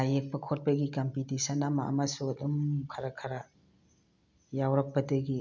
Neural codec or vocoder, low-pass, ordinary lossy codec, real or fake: none; 7.2 kHz; none; real